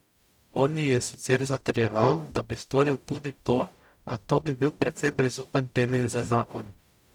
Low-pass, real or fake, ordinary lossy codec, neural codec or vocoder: 19.8 kHz; fake; none; codec, 44.1 kHz, 0.9 kbps, DAC